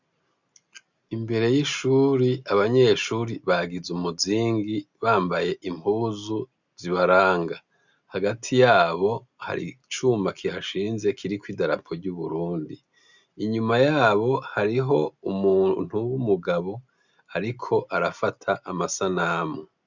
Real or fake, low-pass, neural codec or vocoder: real; 7.2 kHz; none